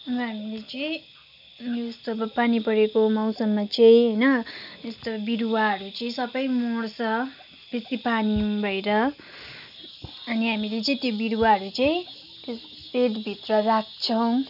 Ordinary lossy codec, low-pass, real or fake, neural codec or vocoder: none; 5.4 kHz; real; none